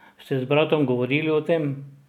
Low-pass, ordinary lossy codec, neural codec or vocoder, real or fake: 19.8 kHz; none; none; real